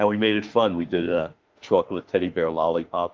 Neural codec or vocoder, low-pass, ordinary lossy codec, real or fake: autoencoder, 48 kHz, 32 numbers a frame, DAC-VAE, trained on Japanese speech; 7.2 kHz; Opus, 32 kbps; fake